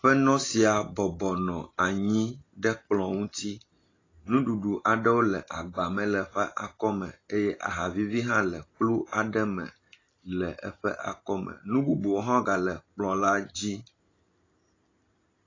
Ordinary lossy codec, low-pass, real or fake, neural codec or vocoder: AAC, 32 kbps; 7.2 kHz; real; none